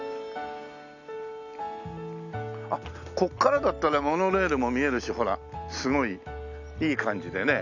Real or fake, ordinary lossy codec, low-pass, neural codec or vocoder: real; none; 7.2 kHz; none